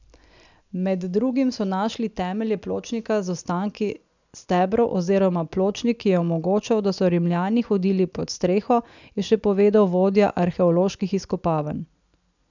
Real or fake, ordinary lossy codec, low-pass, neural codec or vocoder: real; none; 7.2 kHz; none